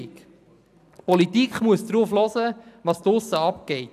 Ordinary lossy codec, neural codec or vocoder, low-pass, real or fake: none; none; 14.4 kHz; real